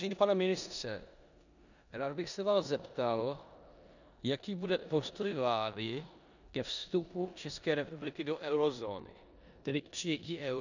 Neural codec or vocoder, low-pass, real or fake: codec, 16 kHz in and 24 kHz out, 0.9 kbps, LongCat-Audio-Codec, four codebook decoder; 7.2 kHz; fake